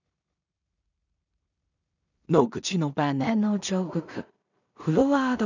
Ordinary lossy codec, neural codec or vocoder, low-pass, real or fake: none; codec, 16 kHz in and 24 kHz out, 0.4 kbps, LongCat-Audio-Codec, two codebook decoder; 7.2 kHz; fake